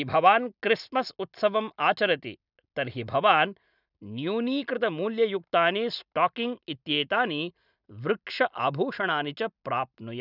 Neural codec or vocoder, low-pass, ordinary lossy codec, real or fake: none; 5.4 kHz; none; real